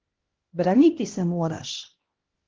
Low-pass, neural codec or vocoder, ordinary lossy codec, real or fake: 7.2 kHz; codec, 16 kHz, 1 kbps, X-Codec, HuBERT features, trained on LibriSpeech; Opus, 16 kbps; fake